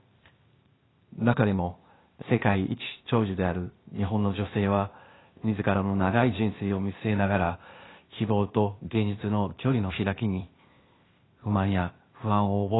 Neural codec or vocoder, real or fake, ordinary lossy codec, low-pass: codec, 16 kHz, 0.8 kbps, ZipCodec; fake; AAC, 16 kbps; 7.2 kHz